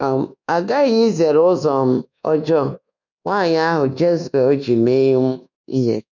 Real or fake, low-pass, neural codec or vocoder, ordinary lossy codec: fake; 7.2 kHz; codec, 24 kHz, 1.2 kbps, DualCodec; none